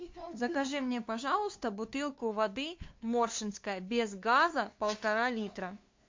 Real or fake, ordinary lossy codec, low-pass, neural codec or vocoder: fake; MP3, 48 kbps; 7.2 kHz; codec, 16 kHz, 2 kbps, FunCodec, trained on LibriTTS, 25 frames a second